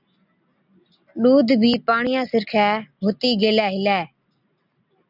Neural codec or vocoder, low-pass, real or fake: none; 5.4 kHz; real